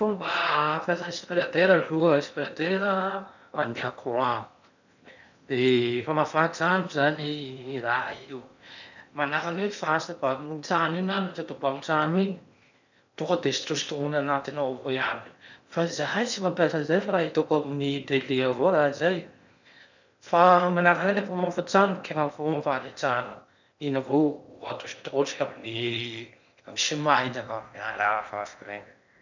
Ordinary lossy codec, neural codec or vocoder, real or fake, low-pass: none; codec, 16 kHz in and 24 kHz out, 0.8 kbps, FocalCodec, streaming, 65536 codes; fake; 7.2 kHz